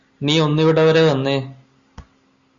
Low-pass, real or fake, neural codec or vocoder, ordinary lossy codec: 7.2 kHz; real; none; Opus, 64 kbps